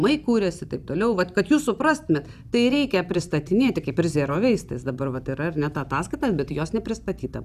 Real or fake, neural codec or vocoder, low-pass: real; none; 14.4 kHz